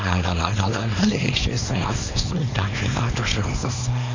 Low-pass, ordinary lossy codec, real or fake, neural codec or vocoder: 7.2 kHz; AAC, 32 kbps; fake; codec, 24 kHz, 0.9 kbps, WavTokenizer, small release